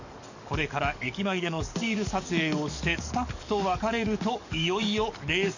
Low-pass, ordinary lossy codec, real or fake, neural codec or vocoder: 7.2 kHz; none; fake; codec, 44.1 kHz, 7.8 kbps, DAC